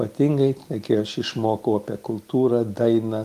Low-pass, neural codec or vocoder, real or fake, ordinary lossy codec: 14.4 kHz; none; real; Opus, 24 kbps